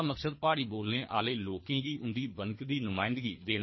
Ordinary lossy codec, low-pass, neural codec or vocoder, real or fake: MP3, 24 kbps; 7.2 kHz; codec, 24 kHz, 3 kbps, HILCodec; fake